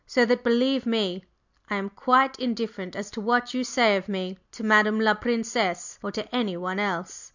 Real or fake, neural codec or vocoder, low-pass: real; none; 7.2 kHz